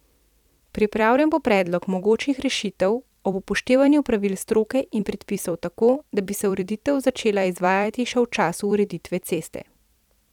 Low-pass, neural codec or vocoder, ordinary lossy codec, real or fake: 19.8 kHz; vocoder, 44.1 kHz, 128 mel bands every 256 samples, BigVGAN v2; none; fake